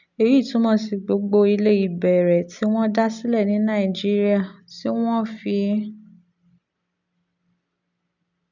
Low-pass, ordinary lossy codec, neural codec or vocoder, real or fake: 7.2 kHz; none; none; real